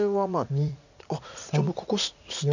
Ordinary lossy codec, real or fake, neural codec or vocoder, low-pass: none; fake; vocoder, 44.1 kHz, 80 mel bands, Vocos; 7.2 kHz